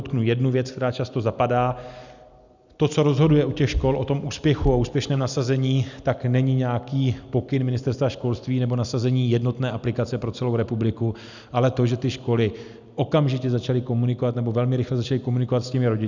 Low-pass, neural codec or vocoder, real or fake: 7.2 kHz; none; real